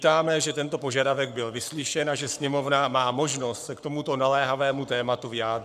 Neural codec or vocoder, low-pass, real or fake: codec, 44.1 kHz, 7.8 kbps, Pupu-Codec; 14.4 kHz; fake